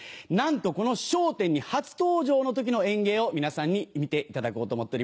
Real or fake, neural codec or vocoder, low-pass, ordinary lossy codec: real; none; none; none